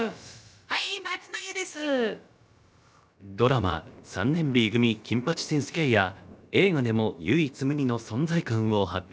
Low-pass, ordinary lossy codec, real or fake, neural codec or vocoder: none; none; fake; codec, 16 kHz, about 1 kbps, DyCAST, with the encoder's durations